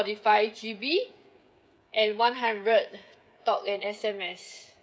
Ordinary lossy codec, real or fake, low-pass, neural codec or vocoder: none; fake; none; codec, 16 kHz, 16 kbps, FreqCodec, smaller model